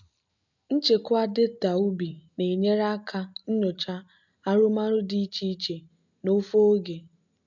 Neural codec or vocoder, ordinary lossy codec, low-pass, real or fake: none; MP3, 64 kbps; 7.2 kHz; real